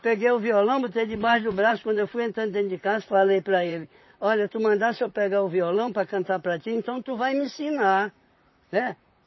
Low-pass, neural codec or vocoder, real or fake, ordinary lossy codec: 7.2 kHz; vocoder, 44.1 kHz, 128 mel bands, Pupu-Vocoder; fake; MP3, 24 kbps